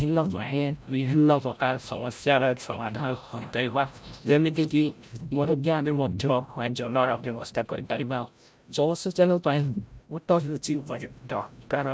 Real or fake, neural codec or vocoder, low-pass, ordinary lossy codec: fake; codec, 16 kHz, 0.5 kbps, FreqCodec, larger model; none; none